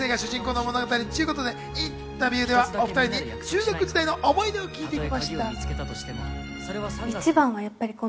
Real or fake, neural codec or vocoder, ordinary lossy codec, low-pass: real; none; none; none